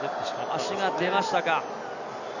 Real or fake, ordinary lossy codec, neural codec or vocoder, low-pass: real; none; none; 7.2 kHz